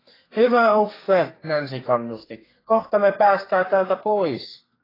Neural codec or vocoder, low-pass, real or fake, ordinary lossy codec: codec, 32 kHz, 1.9 kbps, SNAC; 5.4 kHz; fake; AAC, 24 kbps